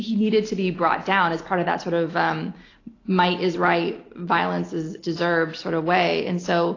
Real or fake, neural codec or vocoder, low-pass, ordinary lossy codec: real; none; 7.2 kHz; AAC, 32 kbps